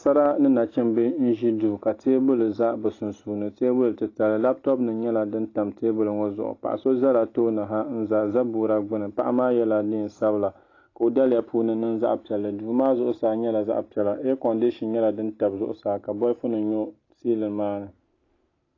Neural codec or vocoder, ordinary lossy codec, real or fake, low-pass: none; AAC, 32 kbps; real; 7.2 kHz